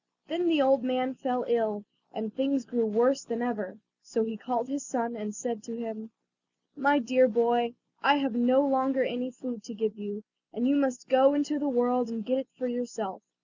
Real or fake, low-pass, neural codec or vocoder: real; 7.2 kHz; none